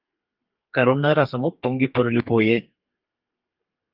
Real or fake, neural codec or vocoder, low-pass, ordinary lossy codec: fake; codec, 32 kHz, 1.9 kbps, SNAC; 5.4 kHz; Opus, 32 kbps